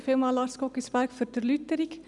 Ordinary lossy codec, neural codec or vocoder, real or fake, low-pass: none; none; real; 10.8 kHz